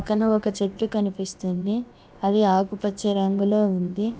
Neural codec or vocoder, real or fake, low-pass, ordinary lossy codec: codec, 16 kHz, about 1 kbps, DyCAST, with the encoder's durations; fake; none; none